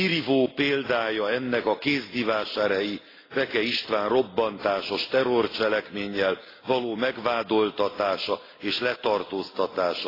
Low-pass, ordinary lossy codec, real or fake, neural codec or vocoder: 5.4 kHz; AAC, 24 kbps; real; none